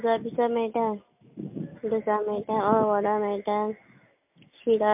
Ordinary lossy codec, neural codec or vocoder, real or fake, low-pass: MP3, 32 kbps; none; real; 3.6 kHz